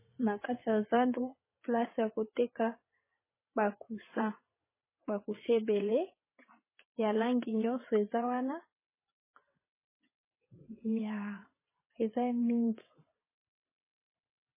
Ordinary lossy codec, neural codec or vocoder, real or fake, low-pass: MP3, 16 kbps; vocoder, 22.05 kHz, 80 mel bands, WaveNeXt; fake; 3.6 kHz